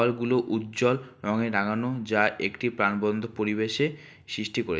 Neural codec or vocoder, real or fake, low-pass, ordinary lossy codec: none; real; none; none